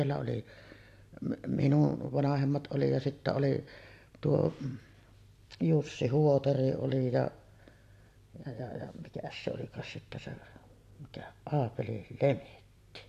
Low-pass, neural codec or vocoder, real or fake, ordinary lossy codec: 14.4 kHz; vocoder, 44.1 kHz, 128 mel bands every 256 samples, BigVGAN v2; fake; AAC, 64 kbps